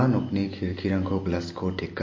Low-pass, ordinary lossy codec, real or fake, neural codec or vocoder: 7.2 kHz; MP3, 32 kbps; real; none